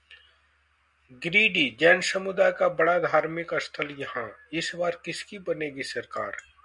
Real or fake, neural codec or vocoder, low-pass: real; none; 10.8 kHz